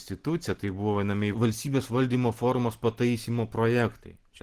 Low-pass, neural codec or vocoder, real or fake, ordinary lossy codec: 14.4 kHz; none; real; Opus, 16 kbps